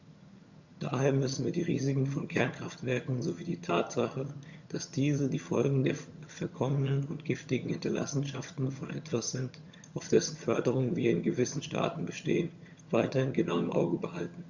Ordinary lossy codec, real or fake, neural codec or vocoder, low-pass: Opus, 64 kbps; fake; vocoder, 22.05 kHz, 80 mel bands, HiFi-GAN; 7.2 kHz